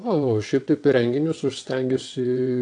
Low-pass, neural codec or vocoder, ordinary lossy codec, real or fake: 9.9 kHz; vocoder, 22.05 kHz, 80 mel bands, WaveNeXt; AAC, 48 kbps; fake